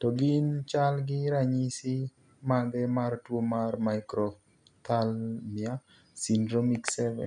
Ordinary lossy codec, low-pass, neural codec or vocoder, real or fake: none; 10.8 kHz; none; real